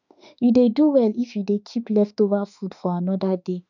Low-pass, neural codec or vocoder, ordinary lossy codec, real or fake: 7.2 kHz; autoencoder, 48 kHz, 32 numbers a frame, DAC-VAE, trained on Japanese speech; none; fake